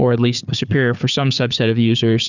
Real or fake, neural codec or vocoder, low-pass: fake; codec, 16 kHz, 4 kbps, FunCodec, trained on Chinese and English, 50 frames a second; 7.2 kHz